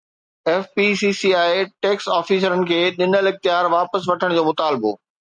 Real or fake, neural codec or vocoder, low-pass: real; none; 9.9 kHz